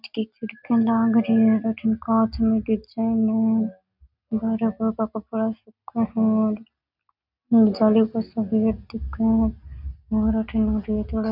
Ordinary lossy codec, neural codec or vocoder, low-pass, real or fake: none; none; 5.4 kHz; real